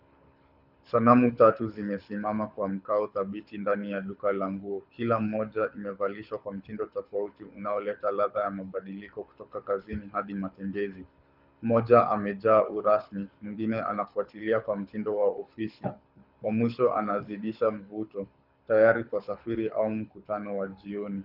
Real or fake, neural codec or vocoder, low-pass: fake; codec, 24 kHz, 6 kbps, HILCodec; 5.4 kHz